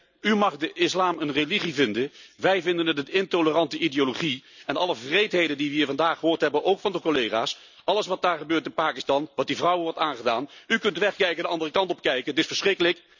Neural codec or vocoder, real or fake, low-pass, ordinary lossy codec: none; real; 7.2 kHz; none